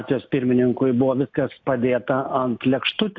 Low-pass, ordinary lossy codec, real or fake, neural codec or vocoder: 7.2 kHz; AAC, 48 kbps; real; none